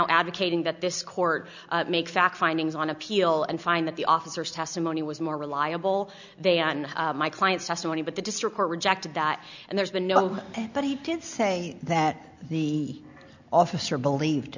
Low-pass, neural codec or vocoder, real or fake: 7.2 kHz; none; real